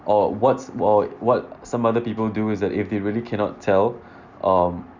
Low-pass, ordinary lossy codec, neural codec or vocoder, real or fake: 7.2 kHz; none; none; real